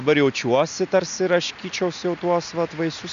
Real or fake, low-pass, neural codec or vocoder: real; 7.2 kHz; none